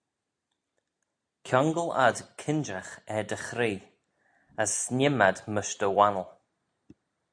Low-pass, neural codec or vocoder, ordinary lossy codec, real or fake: 9.9 kHz; none; AAC, 64 kbps; real